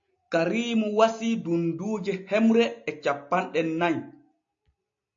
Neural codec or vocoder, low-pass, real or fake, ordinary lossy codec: none; 7.2 kHz; real; MP3, 48 kbps